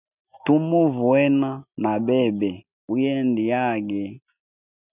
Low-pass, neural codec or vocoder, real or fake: 3.6 kHz; none; real